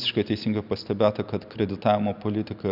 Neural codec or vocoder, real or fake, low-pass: none; real; 5.4 kHz